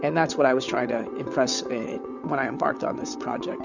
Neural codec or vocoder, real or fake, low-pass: vocoder, 22.05 kHz, 80 mel bands, WaveNeXt; fake; 7.2 kHz